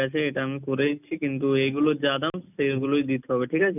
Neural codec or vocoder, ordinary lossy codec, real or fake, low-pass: none; none; real; 3.6 kHz